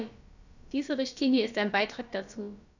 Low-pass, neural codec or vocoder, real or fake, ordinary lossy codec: 7.2 kHz; codec, 16 kHz, about 1 kbps, DyCAST, with the encoder's durations; fake; none